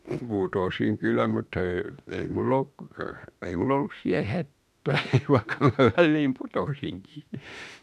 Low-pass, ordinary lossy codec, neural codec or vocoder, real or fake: 14.4 kHz; none; autoencoder, 48 kHz, 32 numbers a frame, DAC-VAE, trained on Japanese speech; fake